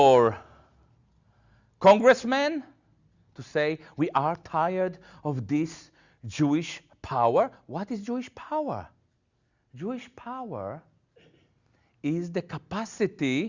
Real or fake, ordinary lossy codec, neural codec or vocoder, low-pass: real; Opus, 64 kbps; none; 7.2 kHz